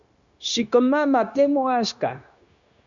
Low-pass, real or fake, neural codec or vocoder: 7.2 kHz; fake; codec, 16 kHz, 0.9 kbps, LongCat-Audio-Codec